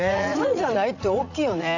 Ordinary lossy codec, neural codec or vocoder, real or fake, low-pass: none; vocoder, 44.1 kHz, 80 mel bands, Vocos; fake; 7.2 kHz